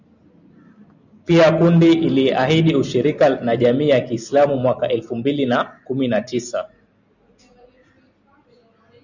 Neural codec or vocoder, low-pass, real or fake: none; 7.2 kHz; real